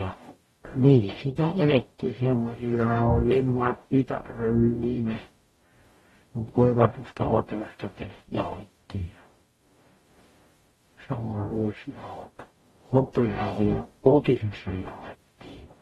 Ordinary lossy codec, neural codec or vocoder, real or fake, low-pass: AAC, 32 kbps; codec, 44.1 kHz, 0.9 kbps, DAC; fake; 19.8 kHz